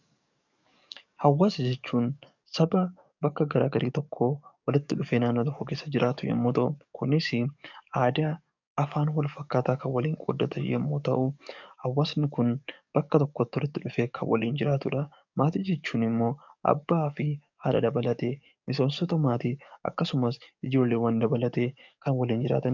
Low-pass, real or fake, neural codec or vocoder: 7.2 kHz; fake; codec, 44.1 kHz, 7.8 kbps, DAC